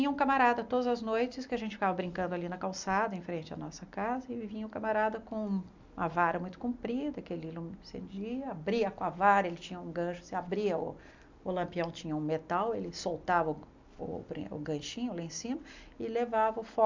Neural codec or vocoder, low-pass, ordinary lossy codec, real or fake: none; 7.2 kHz; MP3, 64 kbps; real